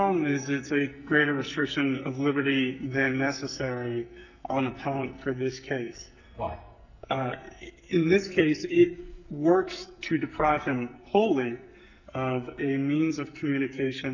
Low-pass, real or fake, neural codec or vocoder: 7.2 kHz; fake; codec, 44.1 kHz, 2.6 kbps, SNAC